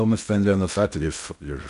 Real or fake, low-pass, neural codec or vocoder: fake; 10.8 kHz; codec, 16 kHz in and 24 kHz out, 0.6 kbps, FocalCodec, streaming, 4096 codes